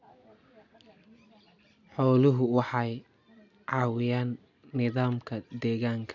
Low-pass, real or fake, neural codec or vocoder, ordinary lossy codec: 7.2 kHz; real; none; none